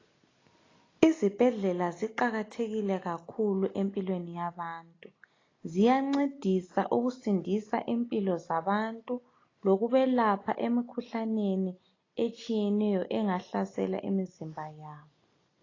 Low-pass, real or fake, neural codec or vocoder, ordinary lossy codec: 7.2 kHz; real; none; AAC, 32 kbps